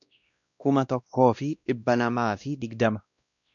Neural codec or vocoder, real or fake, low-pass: codec, 16 kHz, 1 kbps, X-Codec, WavLM features, trained on Multilingual LibriSpeech; fake; 7.2 kHz